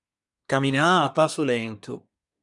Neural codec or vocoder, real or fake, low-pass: codec, 24 kHz, 1 kbps, SNAC; fake; 10.8 kHz